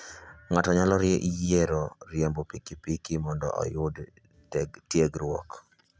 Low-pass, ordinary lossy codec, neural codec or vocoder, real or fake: none; none; none; real